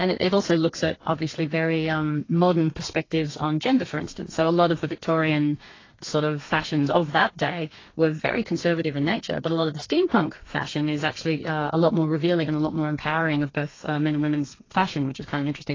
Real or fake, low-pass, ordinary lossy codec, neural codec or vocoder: fake; 7.2 kHz; AAC, 32 kbps; codec, 32 kHz, 1.9 kbps, SNAC